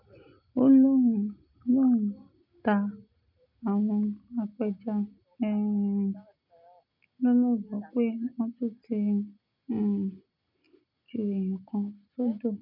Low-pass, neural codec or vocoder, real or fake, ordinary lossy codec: 5.4 kHz; none; real; none